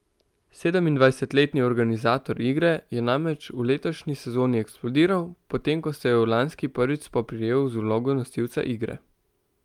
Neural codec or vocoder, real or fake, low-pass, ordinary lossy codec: none; real; 19.8 kHz; Opus, 32 kbps